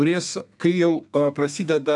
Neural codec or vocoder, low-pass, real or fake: codec, 32 kHz, 1.9 kbps, SNAC; 10.8 kHz; fake